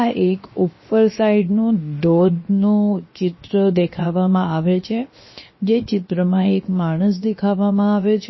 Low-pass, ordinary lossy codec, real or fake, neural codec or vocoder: 7.2 kHz; MP3, 24 kbps; fake; codec, 16 kHz, 0.7 kbps, FocalCodec